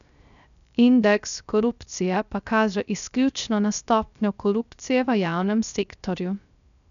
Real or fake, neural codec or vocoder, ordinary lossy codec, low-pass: fake; codec, 16 kHz, 0.3 kbps, FocalCodec; none; 7.2 kHz